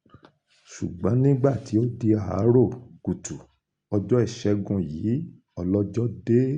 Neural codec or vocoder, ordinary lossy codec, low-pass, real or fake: none; Opus, 64 kbps; 9.9 kHz; real